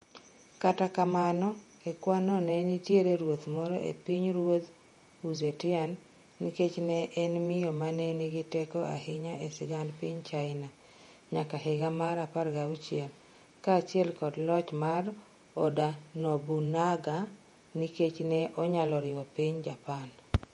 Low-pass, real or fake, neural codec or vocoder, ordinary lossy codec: 19.8 kHz; fake; vocoder, 48 kHz, 128 mel bands, Vocos; MP3, 48 kbps